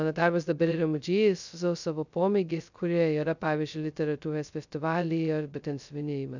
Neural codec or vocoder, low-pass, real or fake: codec, 16 kHz, 0.2 kbps, FocalCodec; 7.2 kHz; fake